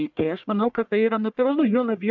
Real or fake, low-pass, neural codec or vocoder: fake; 7.2 kHz; codec, 44.1 kHz, 1.7 kbps, Pupu-Codec